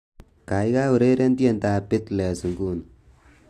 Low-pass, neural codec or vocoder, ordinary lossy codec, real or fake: 14.4 kHz; none; AAC, 64 kbps; real